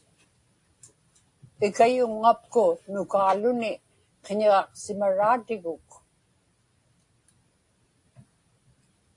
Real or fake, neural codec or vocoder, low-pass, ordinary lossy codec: real; none; 10.8 kHz; AAC, 48 kbps